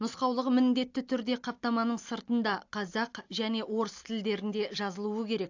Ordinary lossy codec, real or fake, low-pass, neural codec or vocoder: AAC, 48 kbps; real; 7.2 kHz; none